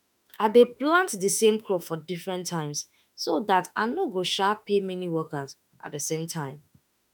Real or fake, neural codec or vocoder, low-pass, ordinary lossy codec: fake; autoencoder, 48 kHz, 32 numbers a frame, DAC-VAE, trained on Japanese speech; none; none